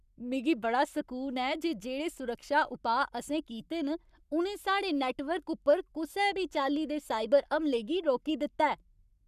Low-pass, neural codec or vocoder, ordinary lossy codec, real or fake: 14.4 kHz; codec, 44.1 kHz, 7.8 kbps, Pupu-Codec; none; fake